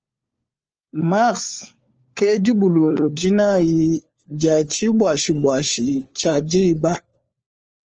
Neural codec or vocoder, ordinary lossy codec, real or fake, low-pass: codec, 16 kHz, 4 kbps, FunCodec, trained on LibriTTS, 50 frames a second; Opus, 24 kbps; fake; 7.2 kHz